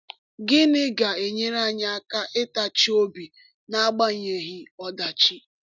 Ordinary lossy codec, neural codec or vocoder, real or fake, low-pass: none; none; real; 7.2 kHz